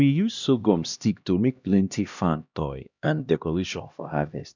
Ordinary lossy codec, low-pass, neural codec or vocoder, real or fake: none; 7.2 kHz; codec, 16 kHz, 1 kbps, X-Codec, HuBERT features, trained on LibriSpeech; fake